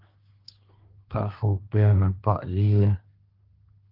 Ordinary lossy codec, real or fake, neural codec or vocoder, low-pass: Opus, 24 kbps; fake; codec, 24 kHz, 1 kbps, SNAC; 5.4 kHz